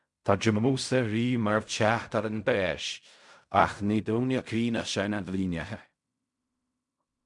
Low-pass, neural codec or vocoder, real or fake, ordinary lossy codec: 10.8 kHz; codec, 16 kHz in and 24 kHz out, 0.4 kbps, LongCat-Audio-Codec, fine tuned four codebook decoder; fake; MP3, 96 kbps